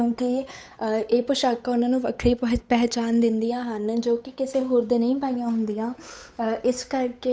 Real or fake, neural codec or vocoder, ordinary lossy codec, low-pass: fake; codec, 16 kHz, 8 kbps, FunCodec, trained on Chinese and English, 25 frames a second; none; none